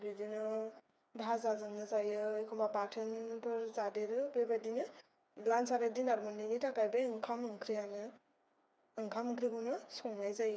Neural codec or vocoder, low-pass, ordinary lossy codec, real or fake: codec, 16 kHz, 4 kbps, FreqCodec, smaller model; none; none; fake